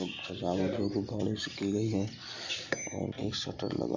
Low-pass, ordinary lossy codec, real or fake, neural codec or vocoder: 7.2 kHz; Opus, 64 kbps; real; none